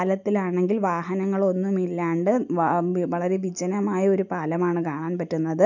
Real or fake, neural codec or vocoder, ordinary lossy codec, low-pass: real; none; none; 7.2 kHz